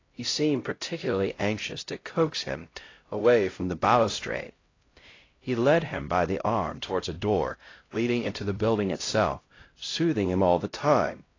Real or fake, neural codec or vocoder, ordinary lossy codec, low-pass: fake; codec, 16 kHz, 0.5 kbps, X-Codec, HuBERT features, trained on LibriSpeech; AAC, 32 kbps; 7.2 kHz